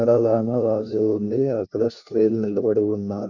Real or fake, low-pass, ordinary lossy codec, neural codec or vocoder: fake; 7.2 kHz; none; codec, 16 kHz, 1 kbps, FunCodec, trained on LibriTTS, 50 frames a second